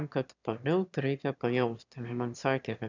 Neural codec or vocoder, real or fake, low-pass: autoencoder, 22.05 kHz, a latent of 192 numbers a frame, VITS, trained on one speaker; fake; 7.2 kHz